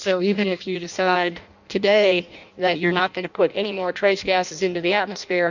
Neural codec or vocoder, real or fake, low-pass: codec, 16 kHz in and 24 kHz out, 0.6 kbps, FireRedTTS-2 codec; fake; 7.2 kHz